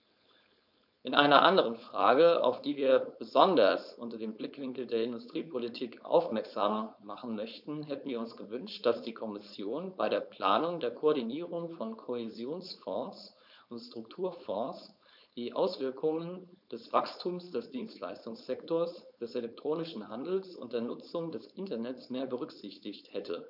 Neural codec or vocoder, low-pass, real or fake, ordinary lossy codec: codec, 16 kHz, 4.8 kbps, FACodec; 5.4 kHz; fake; none